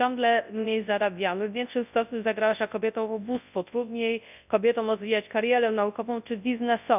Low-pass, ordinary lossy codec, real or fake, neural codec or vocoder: 3.6 kHz; none; fake; codec, 24 kHz, 0.9 kbps, WavTokenizer, large speech release